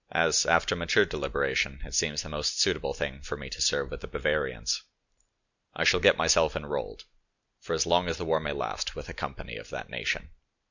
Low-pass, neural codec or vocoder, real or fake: 7.2 kHz; none; real